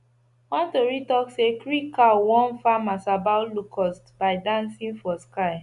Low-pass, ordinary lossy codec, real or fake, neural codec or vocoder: 10.8 kHz; none; real; none